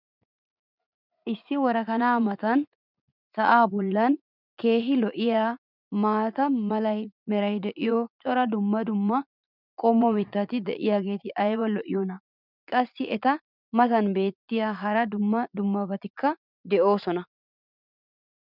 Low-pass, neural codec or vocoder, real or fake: 5.4 kHz; vocoder, 44.1 kHz, 80 mel bands, Vocos; fake